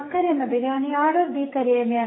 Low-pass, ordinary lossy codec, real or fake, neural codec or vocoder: 7.2 kHz; AAC, 16 kbps; fake; codec, 44.1 kHz, 2.6 kbps, SNAC